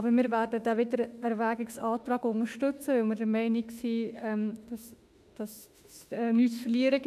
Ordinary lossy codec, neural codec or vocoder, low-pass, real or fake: none; autoencoder, 48 kHz, 32 numbers a frame, DAC-VAE, trained on Japanese speech; 14.4 kHz; fake